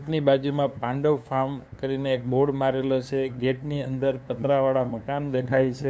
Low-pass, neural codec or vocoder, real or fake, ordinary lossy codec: none; codec, 16 kHz, 2 kbps, FunCodec, trained on LibriTTS, 25 frames a second; fake; none